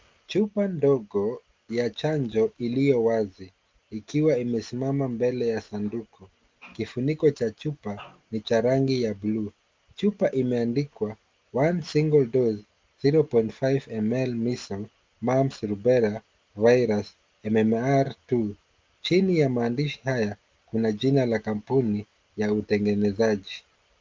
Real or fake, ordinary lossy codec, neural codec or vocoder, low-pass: real; Opus, 32 kbps; none; 7.2 kHz